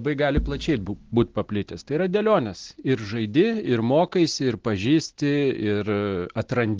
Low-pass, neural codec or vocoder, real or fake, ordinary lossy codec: 7.2 kHz; none; real; Opus, 16 kbps